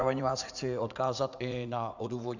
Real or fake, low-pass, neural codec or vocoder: fake; 7.2 kHz; vocoder, 44.1 kHz, 80 mel bands, Vocos